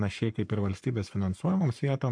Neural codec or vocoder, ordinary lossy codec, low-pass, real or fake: codec, 44.1 kHz, 7.8 kbps, Pupu-Codec; MP3, 64 kbps; 9.9 kHz; fake